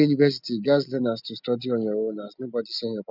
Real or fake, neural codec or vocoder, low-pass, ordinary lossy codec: real; none; 5.4 kHz; none